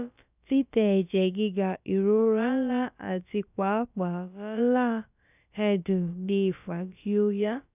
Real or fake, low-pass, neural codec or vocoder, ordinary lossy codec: fake; 3.6 kHz; codec, 16 kHz, about 1 kbps, DyCAST, with the encoder's durations; none